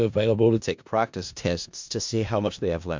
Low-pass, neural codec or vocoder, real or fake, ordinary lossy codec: 7.2 kHz; codec, 16 kHz in and 24 kHz out, 0.4 kbps, LongCat-Audio-Codec, four codebook decoder; fake; MP3, 64 kbps